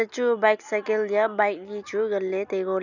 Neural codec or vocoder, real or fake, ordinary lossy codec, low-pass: none; real; none; 7.2 kHz